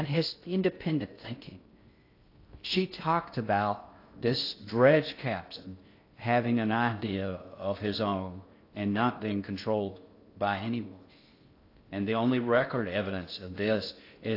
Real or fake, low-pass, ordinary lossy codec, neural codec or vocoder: fake; 5.4 kHz; AAC, 32 kbps; codec, 16 kHz in and 24 kHz out, 0.6 kbps, FocalCodec, streaming, 2048 codes